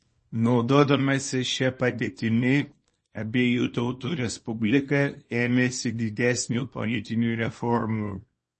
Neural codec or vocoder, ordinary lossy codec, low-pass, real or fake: codec, 24 kHz, 0.9 kbps, WavTokenizer, small release; MP3, 32 kbps; 10.8 kHz; fake